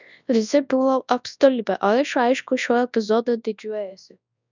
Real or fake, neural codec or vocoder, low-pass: fake; codec, 24 kHz, 0.9 kbps, WavTokenizer, large speech release; 7.2 kHz